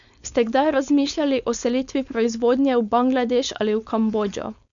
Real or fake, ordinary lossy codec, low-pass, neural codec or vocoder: fake; none; 7.2 kHz; codec, 16 kHz, 4.8 kbps, FACodec